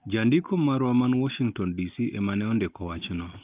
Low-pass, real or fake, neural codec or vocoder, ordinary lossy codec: 3.6 kHz; real; none; Opus, 32 kbps